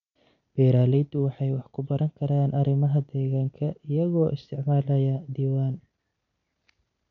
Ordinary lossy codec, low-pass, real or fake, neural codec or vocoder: none; 7.2 kHz; real; none